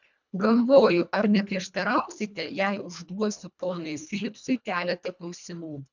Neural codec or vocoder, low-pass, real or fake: codec, 24 kHz, 1.5 kbps, HILCodec; 7.2 kHz; fake